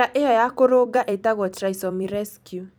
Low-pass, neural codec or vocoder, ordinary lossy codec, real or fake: none; vocoder, 44.1 kHz, 128 mel bands every 256 samples, BigVGAN v2; none; fake